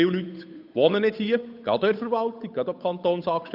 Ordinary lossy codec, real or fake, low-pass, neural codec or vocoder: none; fake; 5.4 kHz; codec, 16 kHz, 8 kbps, FunCodec, trained on Chinese and English, 25 frames a second